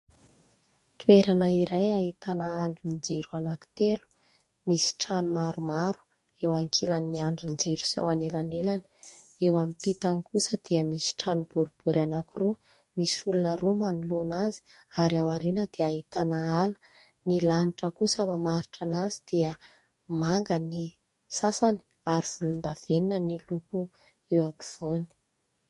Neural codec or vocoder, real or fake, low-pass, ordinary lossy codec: codec, 44.1 kHz, 2.6 kbps, DAC; fake; 14.4 kHz; MP3, 48 kbps